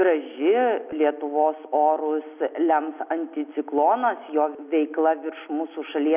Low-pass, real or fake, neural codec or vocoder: 3.6 kHz; real; none